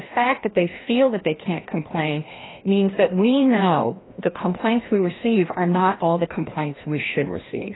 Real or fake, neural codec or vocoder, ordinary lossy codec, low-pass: fake; codec, 16 kHz, 1 kbps, FreqCodec, larger model; AAC, 16 kbps; 7.2 kHz